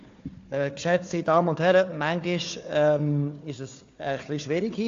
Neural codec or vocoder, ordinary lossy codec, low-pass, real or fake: codec, 16 kHz, 4 kbps, FunCodec, trained on Chinese and English, 50 frames a second; AAC, 48 kbps; 7.2 kHz; fake